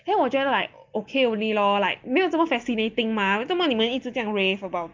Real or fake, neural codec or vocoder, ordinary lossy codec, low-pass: real; none; Opus, 24 kbps; 7.2 kHz